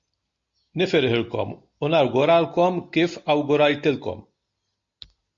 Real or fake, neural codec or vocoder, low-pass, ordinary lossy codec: real; none; 7.2 kHz; MP3, 48 kbps